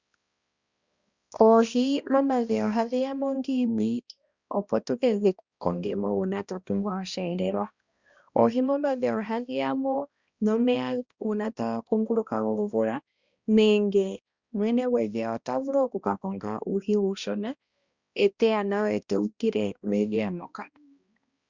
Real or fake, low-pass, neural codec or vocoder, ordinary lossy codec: fake; 7.2 kHz; codec, 16 kHz, 1 kbps, X-Codec, HuBERT features, trained on balanced general audio; Opus, 64 kbps